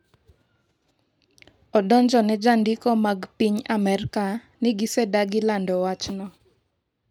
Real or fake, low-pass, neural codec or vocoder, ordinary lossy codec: real; 19.8 kHz; none; none